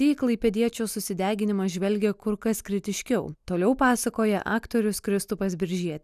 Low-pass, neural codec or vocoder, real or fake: 14.4 kHz; none; real